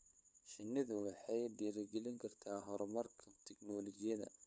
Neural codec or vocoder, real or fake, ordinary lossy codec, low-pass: codec, 16 kHz, 8 kbps, FunCodec, trained on LibriTTS, 25 frames a second; fake; none; none